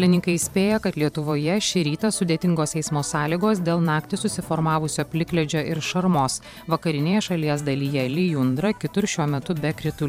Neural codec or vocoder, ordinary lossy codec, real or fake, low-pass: vocoder, 44.1 kHz, 128 mel bands every 512 samples, BigVGAN v2; MP3, 96 kbps; fake; 19.8 kHz